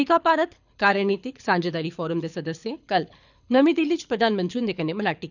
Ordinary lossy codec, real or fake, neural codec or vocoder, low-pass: none; fake; codec, 24 kHz, 6 kbps, HILCodec; 7.2 kHz